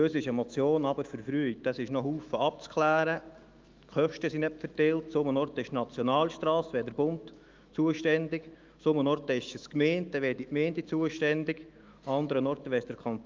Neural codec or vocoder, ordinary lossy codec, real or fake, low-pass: autoencoder, 48 kHz, 128 numbers a frame, DAC-VAE, trained on Japanese speech; Opus, 32 kbps; fake; 7.2 kHz